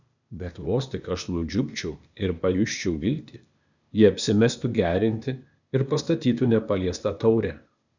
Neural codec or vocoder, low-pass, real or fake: codec, 16 kHz, 0.8 kbps, ZipCodec; 7.2 kHz; fake